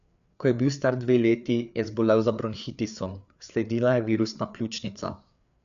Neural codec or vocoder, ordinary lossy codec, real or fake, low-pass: codec, 16 kHz, 4 kbps, FreqCodec, larger model; none; fake; 7.2 kHz